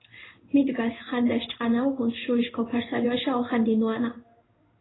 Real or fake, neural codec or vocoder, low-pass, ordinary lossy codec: fake; codec, 16 kHz in and 24 kHz out, 1 kbps, XY-Tokenizer; 7.2 kHz; AAC, 16 kbps